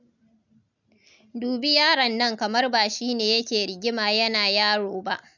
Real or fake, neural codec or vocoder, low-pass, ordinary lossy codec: real; none; 7.2 kHz; none